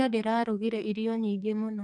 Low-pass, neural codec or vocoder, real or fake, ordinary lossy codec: 9.9 kHz; codec, 44.1 kHz, 2.6 kbps, SNAC; fake; none